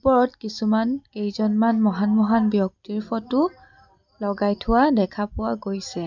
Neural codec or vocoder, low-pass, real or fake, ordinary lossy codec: none; 7.2 kHz; real; none